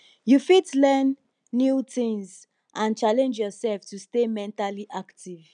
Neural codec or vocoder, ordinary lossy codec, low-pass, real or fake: none; none; 9.9 kHz; real